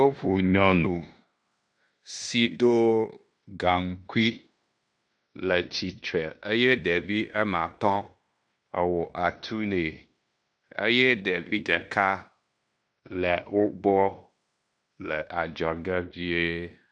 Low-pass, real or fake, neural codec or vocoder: 9.9 kHz; fake; codec, 16 kHz in and 24 kHz out, 0.9 kbps, LongCat-Audio-Codec, fine tuned four codebook decoder